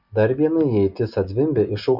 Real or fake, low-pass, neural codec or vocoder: real; 5.4 kHz; none